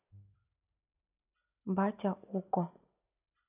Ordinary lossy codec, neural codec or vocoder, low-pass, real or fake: none; none; 3.6 kHz; real